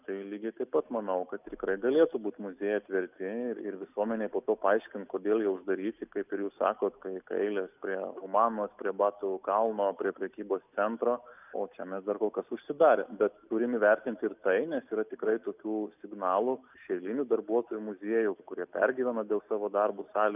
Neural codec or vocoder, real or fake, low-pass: none; real; 3.6 kHz